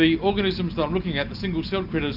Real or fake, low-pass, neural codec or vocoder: real; 5.4 kHz; none